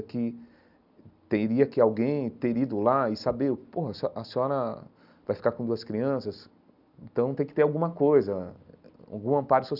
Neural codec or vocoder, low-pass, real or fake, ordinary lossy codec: none; 5.4 kHz; real; none